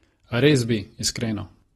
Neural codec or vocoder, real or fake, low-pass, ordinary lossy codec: none; real; 19.8 kHz; AAC, 32 kbps